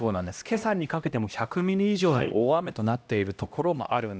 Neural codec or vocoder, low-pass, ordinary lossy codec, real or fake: codec, 16 kHz, 1 kbps, X-Codec, HuBERT features, trained on LibriSpeech; none; none; fake